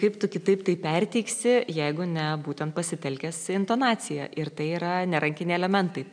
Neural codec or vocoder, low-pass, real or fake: none; 9.9 kHz; real